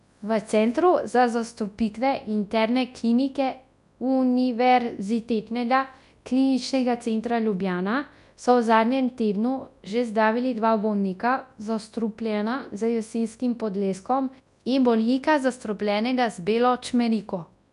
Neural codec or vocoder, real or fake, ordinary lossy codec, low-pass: codec, 24 kHz, 0.9 kbps, WavTokenizer, large speech release; fake; none; 10.8 kHz